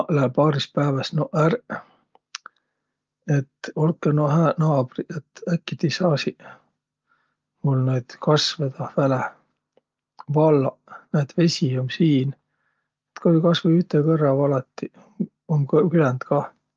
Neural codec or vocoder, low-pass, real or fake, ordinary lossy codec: none; 7.2 kHz; real; Opus, 32 kbps